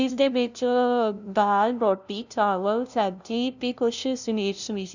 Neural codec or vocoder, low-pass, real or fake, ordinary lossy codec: codec, 16 kHz, 0.5 kbps, FunCodec, trained on LibriTTS, 25 frames a second; 7.2 kHz; fake; none